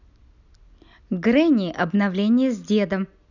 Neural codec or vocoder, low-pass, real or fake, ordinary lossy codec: none; 7.2 kHz; real; none